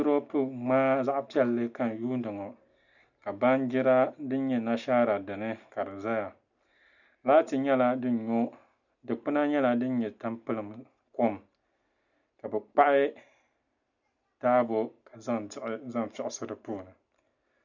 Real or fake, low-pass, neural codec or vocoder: real; 7.2 kHz; none